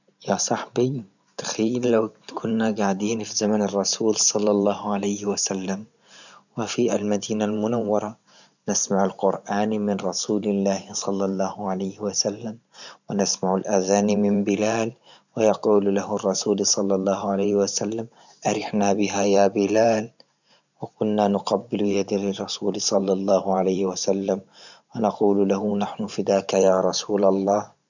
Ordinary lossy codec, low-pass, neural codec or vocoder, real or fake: none; 7.2 kHz; vocoder, 44.1 kHz, 128 mel bands every 512 samples, BigVGAN v2; fake